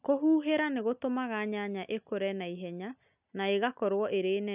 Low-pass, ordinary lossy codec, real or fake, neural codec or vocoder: 3.6 kHz; none; real; none